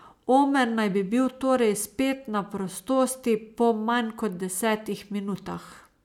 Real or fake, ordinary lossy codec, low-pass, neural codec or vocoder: real; none; 19.8 kHz; none